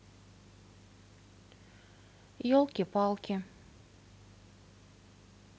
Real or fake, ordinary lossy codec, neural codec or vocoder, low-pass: real; none; none; none